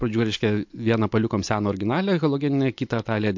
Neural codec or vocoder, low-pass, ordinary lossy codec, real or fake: none; 7.2 kHz; MP3, 48 kbps; real